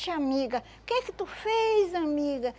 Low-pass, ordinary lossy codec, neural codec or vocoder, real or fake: none; none; none; real